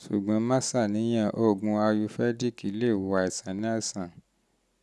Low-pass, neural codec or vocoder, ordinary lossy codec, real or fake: none; none; none; real